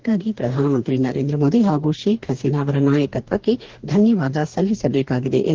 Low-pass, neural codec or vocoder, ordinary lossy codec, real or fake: 7.2 kHz; codec, 44.1 kHz, 2.6 kbps, DAC; Opus, 16 kbps; fake